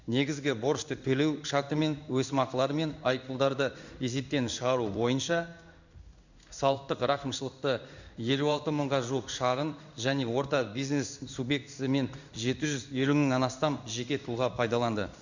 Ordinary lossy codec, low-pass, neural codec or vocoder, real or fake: none; 7.2 kHz; codec, 16 kHz in and 24 kHz out, 1 kbps, XY-Tokenizer; fake